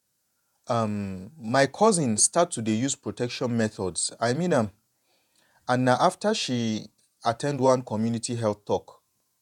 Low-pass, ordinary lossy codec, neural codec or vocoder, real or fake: 19.8 kHz; none; vocoder, 48 kHz, 128 mel bands, Vocos; fake